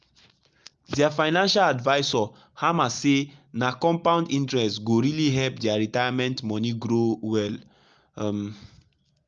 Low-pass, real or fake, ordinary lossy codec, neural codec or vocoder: 7.2 kHz; real; Opus, 24 kbps; none